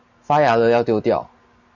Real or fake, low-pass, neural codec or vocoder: real; 7.2 kHz; none